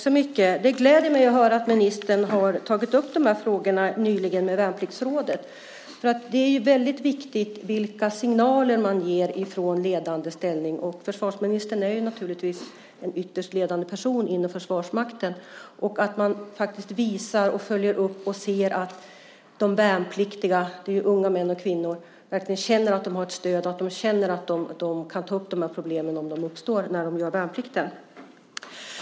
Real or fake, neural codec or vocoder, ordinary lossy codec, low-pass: real; none; none; none